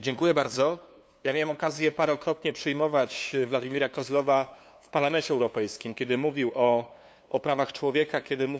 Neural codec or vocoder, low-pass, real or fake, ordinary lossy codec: codec, 16 kHz, 2 kbps, FunCodec, trained on LibriTTS, 25 frames a second; none; fake; none